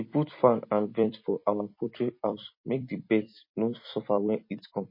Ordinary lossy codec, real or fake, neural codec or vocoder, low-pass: MP3, 24 kbps; fake; vocoder, 44.1 kHz, 128 mel bands every 256 samples, BigVGAN v2; 5.4 kHz